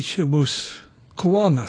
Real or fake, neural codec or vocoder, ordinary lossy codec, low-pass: fake; codec, 24 kHz, 0.9 kbps, WavTokenizer, small release; AAC, 32 kbps; 9.9 kHz